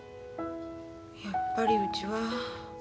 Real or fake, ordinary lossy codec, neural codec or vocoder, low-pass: real; none; none; none